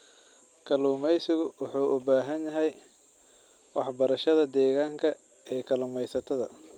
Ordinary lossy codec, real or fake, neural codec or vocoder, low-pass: Opus, 32 kbps; real; none; 19.8 kHz